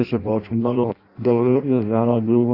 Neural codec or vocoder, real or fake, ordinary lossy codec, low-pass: codec, 16 kHz in and 24 kHz out, 0.6 kbps, FireRedTTS-2 codec; fake; Opus, 64 kbps; 5.4 kHz